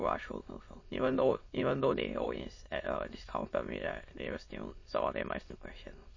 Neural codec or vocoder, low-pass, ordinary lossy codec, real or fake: autoencoder, 22.05 kHz, a latent of 192 numbers a frame, VITS, trained on many speakers; 7.2 kHz; MP3, 32 kbps; fake